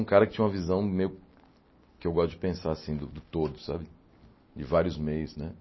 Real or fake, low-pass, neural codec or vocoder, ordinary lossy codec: real; 7.2 kHz; none; MP3, 24 kbps